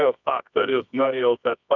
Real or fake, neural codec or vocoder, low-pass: fake; codec, 24 kHz, 0.9 kbps, WavTokenizer, medium music audio release; 7.2 kHz